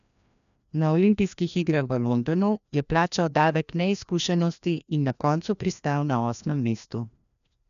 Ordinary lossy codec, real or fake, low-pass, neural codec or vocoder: none; fake; 7.2 kHz; codec, 16 kHz, 1 kbps, FreqCodec, larger model